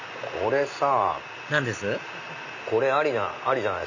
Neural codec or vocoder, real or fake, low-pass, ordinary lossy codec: none; real; 7.2 kHz; none